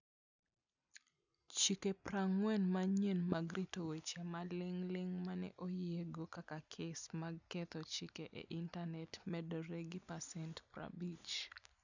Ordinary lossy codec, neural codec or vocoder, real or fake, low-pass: none; none; real; 7.2 kHz